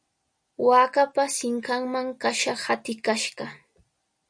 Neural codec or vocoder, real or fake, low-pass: none; real; 9.9 kHz